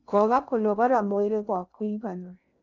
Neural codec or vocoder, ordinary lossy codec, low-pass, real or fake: codec, 16 kHz in and 24 kHz out, 0.6 kbps, FocalCodec, streaming, 2048 codes; none; 7.2 kHz; fake